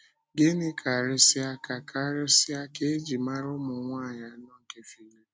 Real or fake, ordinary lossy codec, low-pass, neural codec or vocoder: real; none; none; none